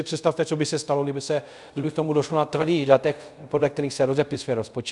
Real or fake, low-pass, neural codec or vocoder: fake; 10.8 kHz; codec, 24 kHz, 0.5 kbps, DualCodec